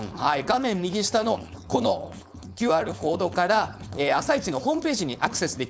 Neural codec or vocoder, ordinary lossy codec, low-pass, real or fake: codec, 16 kHz, 4.8 kbps, FACodec; none; none; fake